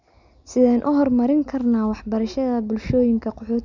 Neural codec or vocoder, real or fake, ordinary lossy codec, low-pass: none; real; none; 7.2 kHz